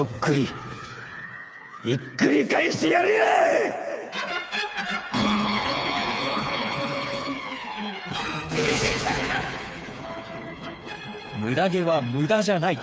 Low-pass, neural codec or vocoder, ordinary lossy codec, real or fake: none; codec, 16 kHz, 4 kbps, FreqCodec, smaller model; none; fake